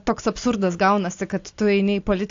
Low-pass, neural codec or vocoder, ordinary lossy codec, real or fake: 7.2 kHz; none; AAC, 48 kbps; real